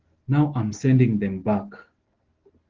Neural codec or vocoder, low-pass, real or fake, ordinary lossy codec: none; 7.2 kHz; real; Opus, 16 kbps